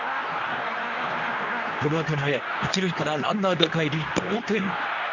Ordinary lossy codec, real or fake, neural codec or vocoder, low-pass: none; fake; codec, 24 kHz, 0.9 kbps, WavTokenizer, medium speech release version 2; 7.2 kHz